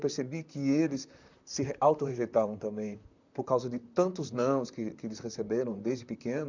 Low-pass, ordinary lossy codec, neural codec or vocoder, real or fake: 7.2 kHz; none; vocoder, 44.1 kHz, 128 mel bands, Pupu-Vocoder; fake